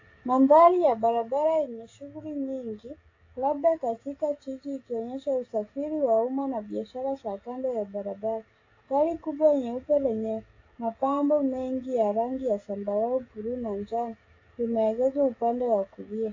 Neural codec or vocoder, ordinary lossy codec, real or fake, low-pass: codec, 16 kHz, 16 kbps, FreqCodec, smaller model; AAC, 32 kbps; fake; 7.2 kHz